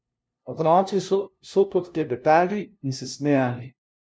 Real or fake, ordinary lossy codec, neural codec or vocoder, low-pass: fake; none; codec, 16 kHz, 0.5 kbps, FunCodec, trained on LibriTTS, 25 frames a second; none